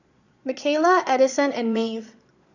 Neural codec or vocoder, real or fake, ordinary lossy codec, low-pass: vocoder, 22.05 kHz, 80 mel bands, Vocos; fake; none; 7.2 kHz